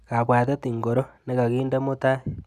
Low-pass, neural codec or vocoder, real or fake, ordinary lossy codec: 14.4 kHz; none; real; none